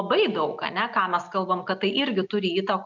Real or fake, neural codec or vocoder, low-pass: real; none; 7.2 kHz